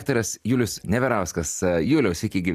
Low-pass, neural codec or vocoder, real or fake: 14.4 kHz; none; real